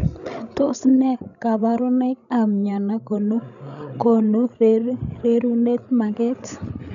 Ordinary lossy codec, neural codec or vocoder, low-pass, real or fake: none; codec, 16 kHz, 16 kbps, FreqCodec, larger model; 7.2 kHz; fake